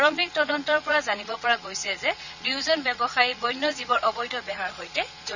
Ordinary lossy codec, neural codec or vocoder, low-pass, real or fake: none; vocoder, 22.05 kHz, 80 mel bands, Vocos; 7.2 kHz; fake